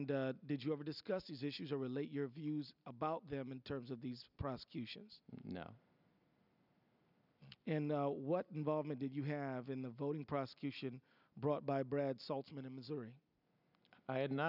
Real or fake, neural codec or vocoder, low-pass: real; none; 5.4 kHz